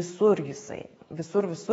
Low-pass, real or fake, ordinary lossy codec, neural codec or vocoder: 19.8 kHz; fake; AAC, 24 kbps; autoencoder, 48 kHz, 128 numbers a frame, DAC-VAE, trained on Japanese speech